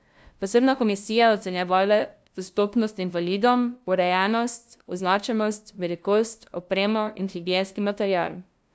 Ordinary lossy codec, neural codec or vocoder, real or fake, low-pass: none; codec, 16 kHz, 0.5 kbps, FunCodec, trained on LibriTTS, 25 frames a second; fake; none